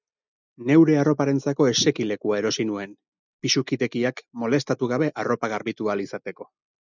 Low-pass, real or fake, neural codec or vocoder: 7.2 kHz; real; none